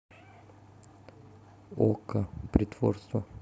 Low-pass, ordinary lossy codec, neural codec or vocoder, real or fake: none; none; none; real